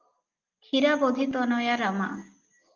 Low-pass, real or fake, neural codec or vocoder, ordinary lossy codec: 7.2 kHz; real; none; Opus, 32 kbps